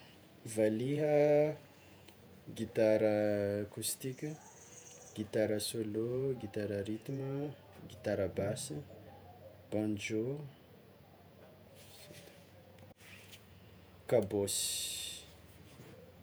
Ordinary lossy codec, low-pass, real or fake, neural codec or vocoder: none; none; fake; vocoder, 48 kHz, 128 mel bands, Vocos